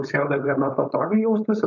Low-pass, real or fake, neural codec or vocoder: 7.2 kHz; fake; codec, 16 kHz, 4.8 kbps, FACodec